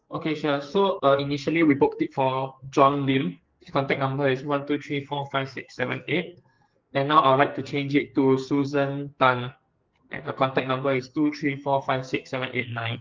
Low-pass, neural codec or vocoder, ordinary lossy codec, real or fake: 7.2 kHz; codec, 44.1 kHz, 2.6 kbps, SNAC; Opus, 32 kbps; fake